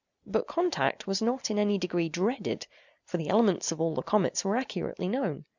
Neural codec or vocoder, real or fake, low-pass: none; real; 7.2 kHz